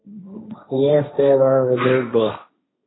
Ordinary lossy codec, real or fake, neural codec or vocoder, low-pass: AAC, 16 kbps; fake; codec, 16 kHz, 1.1 kbps, Voila-Tokenizer; 7.2 kHz